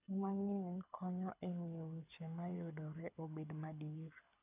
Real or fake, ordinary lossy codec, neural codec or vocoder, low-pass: fake; none; codec, 24 kHz, 6 kbps, HILCodec; 3.6 kHz